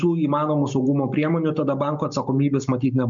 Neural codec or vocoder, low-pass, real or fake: none; 7.2 kHz; real